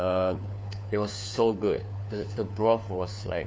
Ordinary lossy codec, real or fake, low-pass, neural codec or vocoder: none; fake; none; codec, 16 kHz, 4 kbps, FunCodec, trained on LibriTTS, 50 frames a second